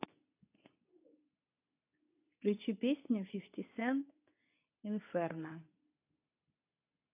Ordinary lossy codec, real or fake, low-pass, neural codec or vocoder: AAC, 32 kbps; fake; 3.6 kHz; codec, 24 kHz, 0.9 kbps, WavTokenizer, medium speech release version 2